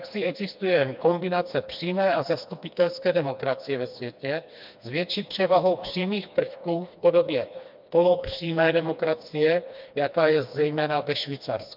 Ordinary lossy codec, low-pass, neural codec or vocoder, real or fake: MP3, 48 kbps; 5.4 kHz; codec, 16 kHz, 2 kbps, FreqCodec, smaller model; fake